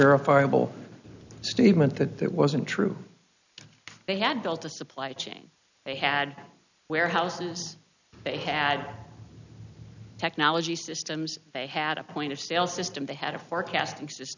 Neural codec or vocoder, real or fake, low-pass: none; real; 7.2 kHz